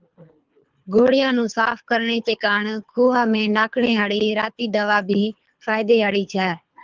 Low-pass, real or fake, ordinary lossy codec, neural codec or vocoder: 7.2 kHz; fake; Opus, 24 kbps; codec, 24 kHz, 3 kbps, HILCodec